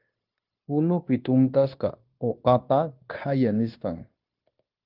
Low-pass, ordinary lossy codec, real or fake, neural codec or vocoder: 5.4 kHz; Opus, 32 kbps; fake; codec, 16 kHz, 0.9 kbps, LongCat-Audio-Codec